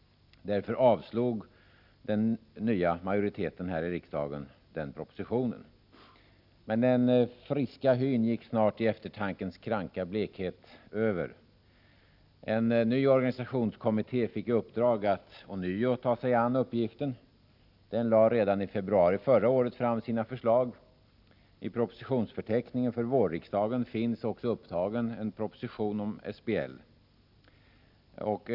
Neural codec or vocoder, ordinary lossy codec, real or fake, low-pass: none; none; real; 5.4 kHz